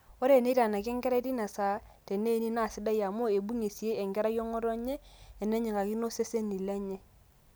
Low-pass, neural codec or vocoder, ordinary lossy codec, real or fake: none; none; none; real